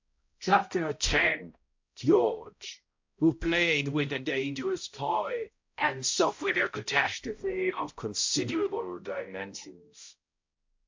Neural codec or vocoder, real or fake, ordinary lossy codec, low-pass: codec, 16 kHz, 0.5 kbps, X-Codec, HuBERT features, trained on balanced general audio; fake; MP3, 48 kbps; 7.2 kHz